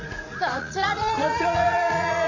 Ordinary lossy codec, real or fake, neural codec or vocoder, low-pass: none; fake; vocoder, 44.1 kHz, 80 mel bands, Vocos; 7.2 kHz